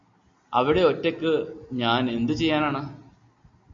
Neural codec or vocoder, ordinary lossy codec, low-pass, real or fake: none; MP3, 48 kbps; 7.2 kHz; real